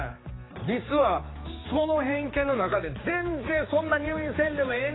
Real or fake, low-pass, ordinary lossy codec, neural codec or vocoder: fake; 7.2 kHz; AAC, 16 kbps; codec, 16 kHz, 4 kbps, X-Codec, HuBERT features, trained on general audio